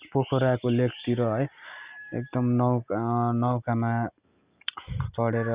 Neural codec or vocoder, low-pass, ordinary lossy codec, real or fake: none; 3.6 kHz; Opus, 64 kbps; real